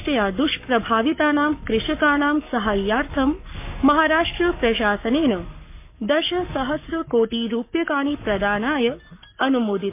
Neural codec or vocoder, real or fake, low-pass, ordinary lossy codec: codec, 44.1 kHz, 7.8 kbps, Pupu-Codec; fake; 3.6 kHz; MP3, 24 kbps